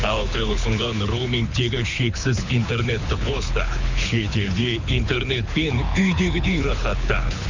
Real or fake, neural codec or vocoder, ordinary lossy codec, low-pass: fake; codec, 24 kHz, 6 kbps, HILCodec; Opus, 64 kbps; 7.2 kHz